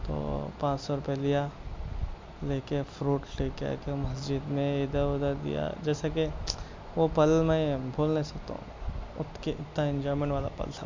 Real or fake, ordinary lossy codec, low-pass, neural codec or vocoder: real; MP3, 64 kbps; 7.2 kHz; none